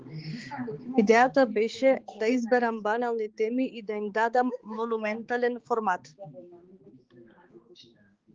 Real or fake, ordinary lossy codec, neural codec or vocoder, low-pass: fake; Opus, 16 kbps; codec, 16 kHz, 4 kbps, X-Codec, HuBERT features, trained on balanced general audio; 7.2 kHz